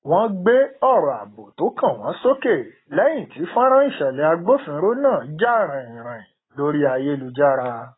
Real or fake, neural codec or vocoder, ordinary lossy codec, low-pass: real; none; AAC, 16 kbps; 7.2 kHz